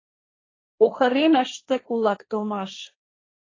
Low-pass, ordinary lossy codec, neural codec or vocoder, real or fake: 7.2 kHz; AAC, 32 kbps; codec, 16 kHz, 1.1 kbps, Voila-Tokenizer; fake